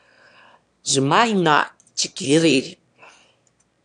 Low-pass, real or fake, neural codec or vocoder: 9.9 kHz; fake; autoencoder, 22.05 kHz, a latent of 192 numbers a frame, VITS, trained on one speaker